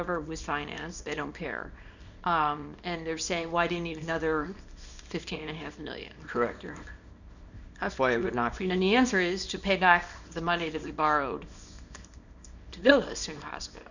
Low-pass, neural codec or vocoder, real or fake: 7.2 kHz; codec, 24 kHz, 0.9 kbps, WavTokenizer, small release; fake